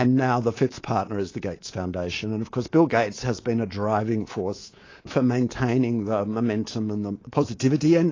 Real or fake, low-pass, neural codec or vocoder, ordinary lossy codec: fake; 7.2 kHz; codec, 24 kHz, 3.1 kbps, DualCodec; AAC, 32 kbps